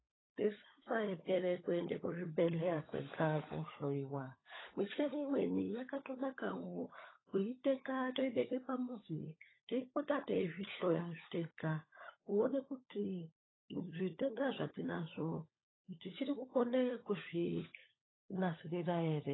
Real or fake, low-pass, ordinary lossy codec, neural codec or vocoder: fake; 7.2 kHz; AAC, 16 kbps; codec, 16 kHz, 16 kbps, FunCodec, trained on LibriTTS, 50 frames a second